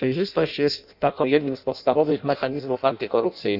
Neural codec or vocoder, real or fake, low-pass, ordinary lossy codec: codec, 16 kHz in and 24 kHz out, 0.6 kbps, FireRedTTS-2 codec; fake; 5.4 kHz; none